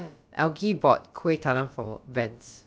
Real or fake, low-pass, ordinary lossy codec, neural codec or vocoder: fake; none; none; codec, 16 kHz, about 1 kbps, DyCAST, with the encoder's durations